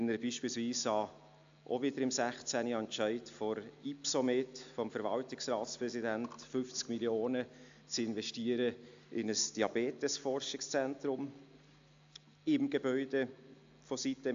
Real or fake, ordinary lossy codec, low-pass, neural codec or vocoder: real; AAC, 64 kbps; 7.2 kHz; none